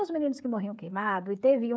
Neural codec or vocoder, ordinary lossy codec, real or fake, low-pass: codec, 16 kHz, 16 kbps, FreqCodec, smaller model; none; fake; none